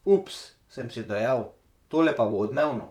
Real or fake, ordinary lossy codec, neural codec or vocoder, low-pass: fake; none; vocoder, 44.1 kHz, 128 mel bands, Pupu-Vocoder; 19.8 kHz